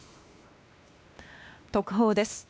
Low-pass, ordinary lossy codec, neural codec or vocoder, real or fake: none; none; codec, 16 kHz, 2 kbps, X-Codec, WavLM features, trained on Multilingual LibriSpeech; fake